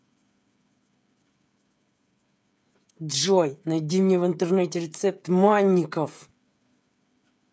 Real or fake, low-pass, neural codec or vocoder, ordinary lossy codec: fake; none; codec, 16 kHz, 16 kbps, FreqCodec, smaller model; none